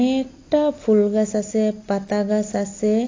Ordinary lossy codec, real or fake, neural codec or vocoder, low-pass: AAC, 32 kbps; real; none; 7.2 kHz